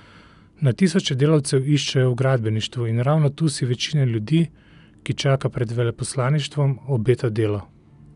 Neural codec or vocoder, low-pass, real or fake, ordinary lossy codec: none; 10.8 kHz; real; none